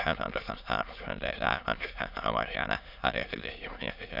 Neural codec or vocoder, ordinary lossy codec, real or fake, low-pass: autoencoder, 22.05 kHz, a latent of 192 numbers a frame, VITS, trained on many speakers; none; fake; 5.4 kHz